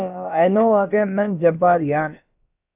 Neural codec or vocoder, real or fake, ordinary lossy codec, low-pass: codec, 16 kHz, about 1 kbps, DyCAST, with the encoder's durations; fake; AAC, 32 kbps; 3.6 kHz